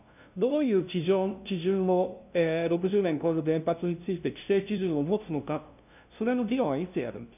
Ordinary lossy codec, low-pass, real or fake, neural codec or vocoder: none; 3.6 kHz; fake; codec, 16 kHz, 0.5 kbps, FunCodec, trained on LibriTTS, 25 frames a second